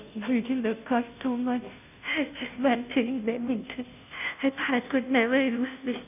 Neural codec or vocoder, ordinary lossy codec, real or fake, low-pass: codec, 16 kHz, 0.5 kbps, FunCodec, trained on Chinese and English, 25 frames a second; none; fake; 3.6 kHz